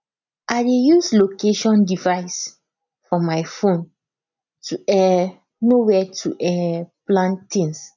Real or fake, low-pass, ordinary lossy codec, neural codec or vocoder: real; 7.2 kHz; none; none